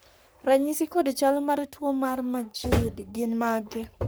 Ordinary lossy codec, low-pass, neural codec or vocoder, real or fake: none; none; codec, 44.1 kHz, 3.4 kbps, Pupu-Codec; fake